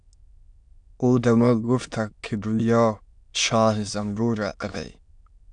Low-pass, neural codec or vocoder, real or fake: 9.9 kHz; autoencoder, 22.05 kHz, a latent of 192 numbers a frame, VITS, trained on many speakers; fake